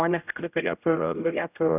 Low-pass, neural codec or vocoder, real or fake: 3.6 kHz; codec, 16 kHz, 0.5 kbps, X-Codec, HuBERT features, trained on general audio; fake